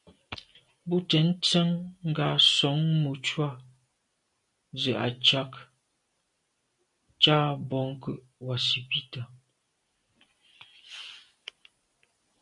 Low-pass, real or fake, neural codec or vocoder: 10.8 kHz; real; none